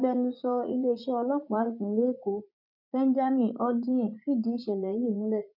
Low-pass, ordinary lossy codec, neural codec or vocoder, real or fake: 5.4 kHz; none; vocoder, 44.1 kHz, 80 mel bands, Vocos; fake